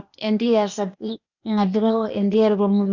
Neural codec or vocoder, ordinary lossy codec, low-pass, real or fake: codec, 16 kHz, 0.8 kbps, ZipCodec; none; 7.2 kHz; fake